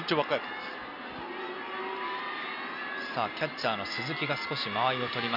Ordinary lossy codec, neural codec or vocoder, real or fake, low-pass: none; none; real; 5.4 kHz